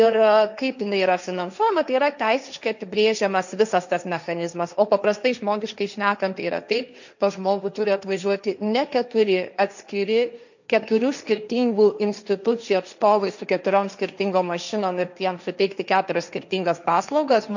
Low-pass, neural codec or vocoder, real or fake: 7.2 kHz; codec, 16 kHz, 1.1 kbps, Voila-Tokenizer; fake